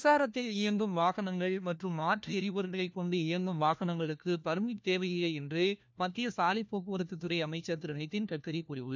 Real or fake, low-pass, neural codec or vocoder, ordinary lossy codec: fake; none; codec, 16 kHz, 1 kbps, FunCodec, trained on LibriTTS, 50 frames a second; none